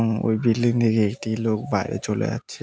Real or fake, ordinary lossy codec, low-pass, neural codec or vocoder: real; none; none; none